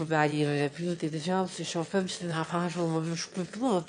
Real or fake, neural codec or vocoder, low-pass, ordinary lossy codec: fake; autoencoder, 22.05 kHz, a latent of 192 numbers a frame, VITS, trained on one speaker; 9.9 kHz; AAC, 64 kbps